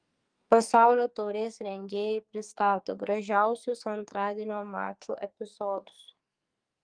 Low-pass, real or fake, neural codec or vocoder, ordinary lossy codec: 9.9 kHz; fake; codec, 44.1 kHz, 2.6 kbps, SNAC; Opus, 32 kbps